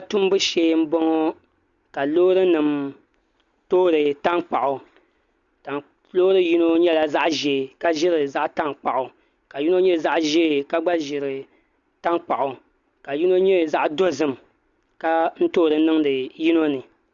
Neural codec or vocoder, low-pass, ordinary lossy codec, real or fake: none; 7.2 kHz; Opus, 64 kbps; real